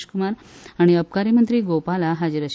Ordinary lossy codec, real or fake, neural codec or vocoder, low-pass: none; real; none; none